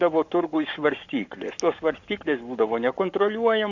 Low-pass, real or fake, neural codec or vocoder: 7.2 kHz; fake; codec, 44.1 kHz, 7.8 kbps, Pupu-Codec